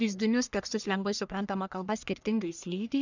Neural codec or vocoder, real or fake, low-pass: codec, 44.1 kHz, 1.7 kbps, Pupu-Codec; fake; 7.2 kHz